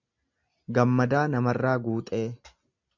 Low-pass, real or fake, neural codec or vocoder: 7.2 kHz; real; none